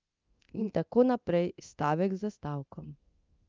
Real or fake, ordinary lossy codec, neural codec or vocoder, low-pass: fake; Opus, 24 kbps; codec, 24 kHz, 0.9 kbps, DualCodec; 7.2 kHz